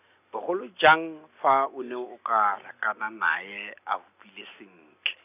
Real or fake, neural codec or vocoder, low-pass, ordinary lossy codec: real; none; 3.6 kHz; none